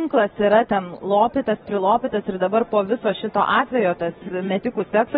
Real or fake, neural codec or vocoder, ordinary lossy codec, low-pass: real; none; AAC, 16 kbps; 19.8 kHz